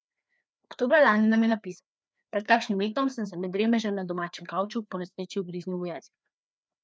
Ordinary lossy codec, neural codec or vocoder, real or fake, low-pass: none; codec, 16 kHz, 2 kbps, FreqCodec, larger model; fake; none